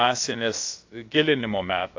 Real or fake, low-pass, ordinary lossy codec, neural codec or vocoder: fake; 7.2 kHz; AAC, 48 kbps; codec, 16 kHz, about 1 kbps, DyCAST, with the encoder's durations